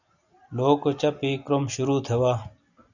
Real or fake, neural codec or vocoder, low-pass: real; none; 7.2 kHz